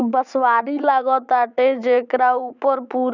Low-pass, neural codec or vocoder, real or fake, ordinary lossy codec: 7.2 kHz; vocoder, 44.1 kHz, 80 mel bands, Vocos; fake; none